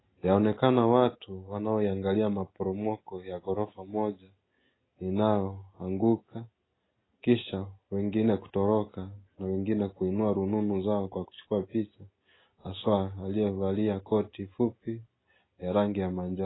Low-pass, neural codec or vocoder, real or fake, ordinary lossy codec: 7.2 kHz; none; real; AAC, 16 kbps